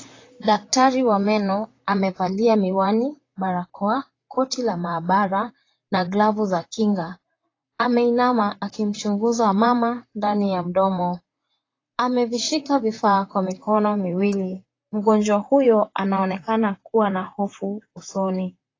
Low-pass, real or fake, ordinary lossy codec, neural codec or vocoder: 7.2 kHz; fake; AAC, 32 kbps; vocoder, 44.1 kHz, 128 mel bands, Pupu-Vocoder